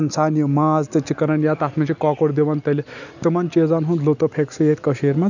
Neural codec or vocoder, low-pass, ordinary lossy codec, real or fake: none; 7.2 kHz; none; real